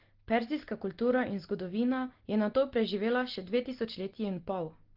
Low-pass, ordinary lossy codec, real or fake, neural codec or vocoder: 5.4 kHz; Opus, 16 kbps; real; none